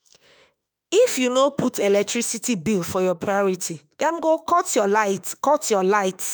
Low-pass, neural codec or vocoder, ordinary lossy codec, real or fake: none; autoencoder, 48 kHz, 32 numbers a frame, DAC-VAE, trained on Japanese speech; none; fake